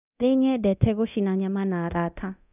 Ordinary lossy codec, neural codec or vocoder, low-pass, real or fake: none; codec, 24 kHz, 0.9 kbps, DualCodec; 3.6 kHz; fake